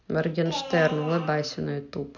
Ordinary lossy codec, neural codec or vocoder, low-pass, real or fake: none; none; 7.2 kHz; real